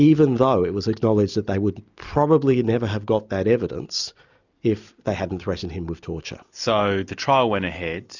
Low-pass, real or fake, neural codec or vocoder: 7.2 kHz; real; none